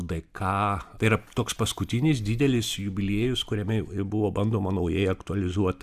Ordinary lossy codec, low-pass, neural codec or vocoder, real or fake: Opus, 64 kbps; 14.4 kHz; none; real